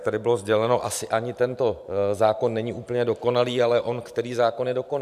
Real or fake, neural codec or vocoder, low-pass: fake; vocoder, 44.1 kHz, 128 mel bands every 512 samples, BigVGAN v2; 14.4 kHz